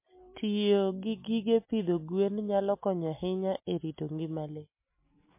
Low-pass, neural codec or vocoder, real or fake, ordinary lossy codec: 3.6 kHz; none; real; MP3, 24 kbps